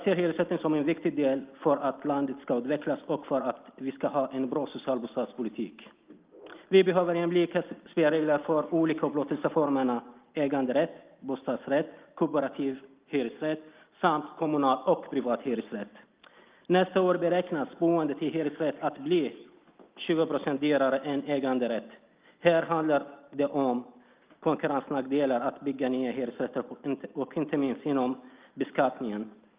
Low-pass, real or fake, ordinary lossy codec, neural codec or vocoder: 3.6 kHz; real; Opus, 24 kbps; none